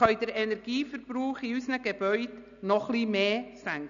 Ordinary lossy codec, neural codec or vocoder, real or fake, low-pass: none; none; real; 7.2 kHz